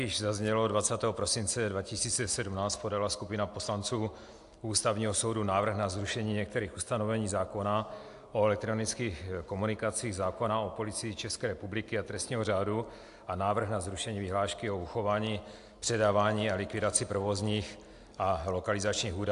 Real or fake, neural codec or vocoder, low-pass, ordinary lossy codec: real; none; 10.8 kHz; AAC, 64 kbps